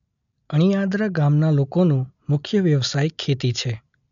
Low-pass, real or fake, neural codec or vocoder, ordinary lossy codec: 7.2 kHz; real; none; none